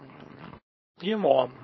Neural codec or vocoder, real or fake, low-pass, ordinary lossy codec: codec, 24 kHz, 0.9 kbps, WavTokenizer, small release; fake; 7.2 kHz; MP3, 24 kbps